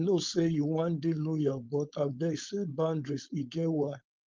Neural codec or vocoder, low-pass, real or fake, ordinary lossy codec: codec, 16 kHz, 4.8 kbps, FACodec; 7.2 kHz; fake; Opus, 32 kbps